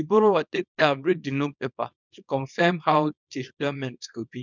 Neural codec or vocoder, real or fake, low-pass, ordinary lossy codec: codec, 24 kHz, 0.9 kbps, WavTokenizer, small release; fake; 7.2 kHz; none